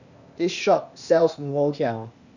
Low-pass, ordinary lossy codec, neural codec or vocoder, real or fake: 7.2 kHz; none; codec, 16 kHz, 0.8 kbps, ZipCodec; fake